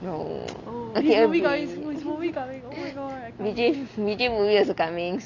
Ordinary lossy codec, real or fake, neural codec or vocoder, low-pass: none; real; none; 7.2 kHz